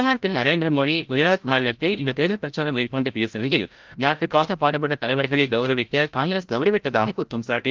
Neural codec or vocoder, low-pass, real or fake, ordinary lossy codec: codec, 16 kHz, 0.5 kbps, FreqCodec, larger model; 7.2 kHz; fake; Opus, 32 kbps